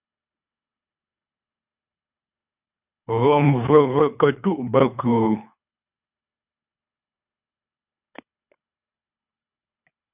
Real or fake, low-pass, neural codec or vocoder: fake; 3.6 kHz; codec, 24 kHz, 3 kbps, HILCodec